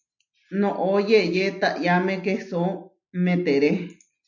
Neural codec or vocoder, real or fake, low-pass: none; real; 7.2 kHz